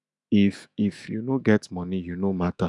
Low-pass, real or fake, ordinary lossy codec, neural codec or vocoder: 10.8 kHz; fake; none; autoencoder, 48 kHz, 128 numbers a frame, DAC-VAE, trained on Japanese speech